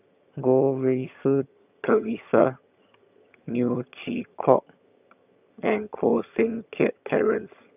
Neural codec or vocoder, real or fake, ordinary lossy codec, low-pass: vocoder, 22.05 kHz, 80 mel bands, HiFi-GAN; fake; none; 3.6 kHz